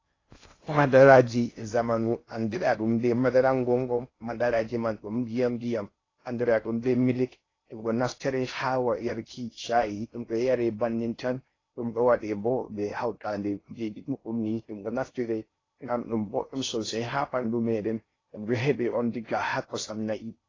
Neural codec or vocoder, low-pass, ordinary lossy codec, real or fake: codec, 16 kHz in and 24 kHz out, 0.6 kbps, FocalCodec, streaming, 2048 codes; 7.2 kHz; AAC, 32 kbps; fake